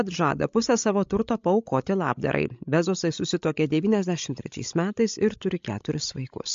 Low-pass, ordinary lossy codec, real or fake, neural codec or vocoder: 7.2 kHz; MP3, 48 kbps; fake; codec, 16 kHz, 16 kbps, FreqCodec, larger model